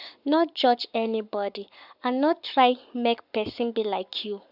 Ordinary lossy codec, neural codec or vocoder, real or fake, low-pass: none; codec, 44.1 kHz, 7.8 kbps, Pupu-Codec; fake; 5.4 kHz